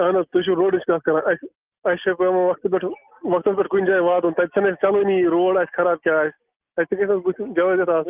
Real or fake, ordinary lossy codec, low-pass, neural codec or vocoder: real; Opus, 32 kbps; 3.6 kHz; none